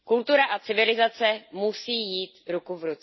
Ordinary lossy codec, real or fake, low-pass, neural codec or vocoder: MP3, 24 kbps; real; 7.2 kHz; none